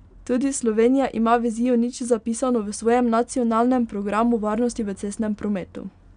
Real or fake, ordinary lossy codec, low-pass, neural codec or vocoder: real; none; 9.9 kHz; none